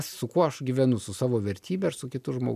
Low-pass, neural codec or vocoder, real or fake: 14.4 kHz; none; real